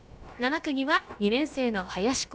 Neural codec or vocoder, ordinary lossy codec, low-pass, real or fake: codec, 16 kHz, about 1 kbps, DyCAST, with the encoder's durations; none; none; fake